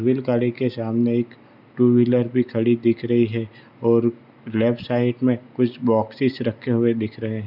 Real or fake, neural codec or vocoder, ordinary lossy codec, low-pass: real; none; none; 5.4 kHz